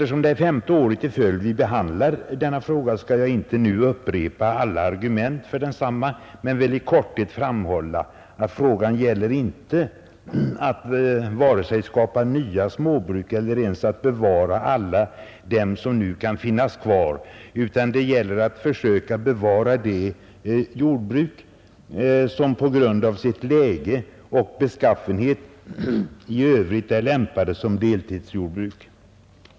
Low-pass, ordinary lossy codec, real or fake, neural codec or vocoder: none; none; real; none